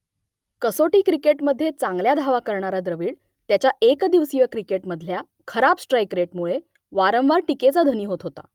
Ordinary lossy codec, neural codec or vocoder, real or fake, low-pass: Opus, 32 kbps; none; real; 19.8 kHz